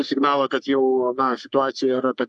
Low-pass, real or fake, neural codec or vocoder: 10.8 kHz; fake; codec, 44.1 kHz, 3.4 kbps, Pupu-Codec